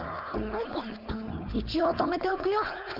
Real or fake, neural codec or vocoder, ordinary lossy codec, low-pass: fake; codec, 16 kHz, 4.8 kbps, FACodec; none; 5.4 kHz